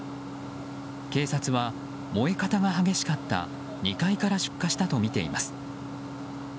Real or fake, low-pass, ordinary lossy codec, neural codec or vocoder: real; none; none; none